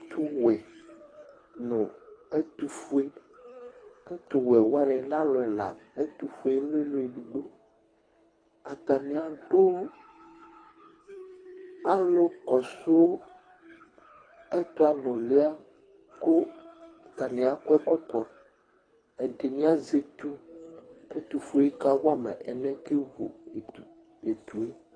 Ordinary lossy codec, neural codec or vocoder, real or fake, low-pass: AAC, 32 kbps; codec, 24 kHz, 3 kbps, HILCodec; fake; 9.9 kHz